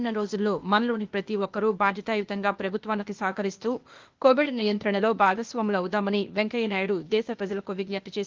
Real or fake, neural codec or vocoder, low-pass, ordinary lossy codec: fake; codec, 16 kHz, 0.8 kbps, ZipCodec; 7.2 kHz; Opus, 32 kbps